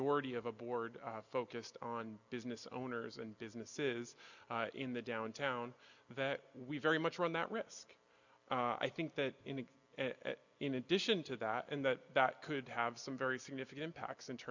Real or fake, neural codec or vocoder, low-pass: real; none; 7.2 kHz